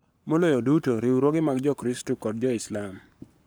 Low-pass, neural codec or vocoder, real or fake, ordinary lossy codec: none; codec, 44.1 kHz, 7.8 kbps, Pupu-Codec; fake; none